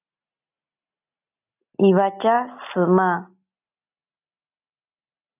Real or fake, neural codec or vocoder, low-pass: real; none; 3.6 kHz